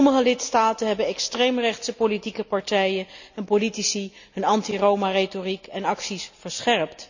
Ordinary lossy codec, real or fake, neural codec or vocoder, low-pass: none; real; none; 7.2 kHz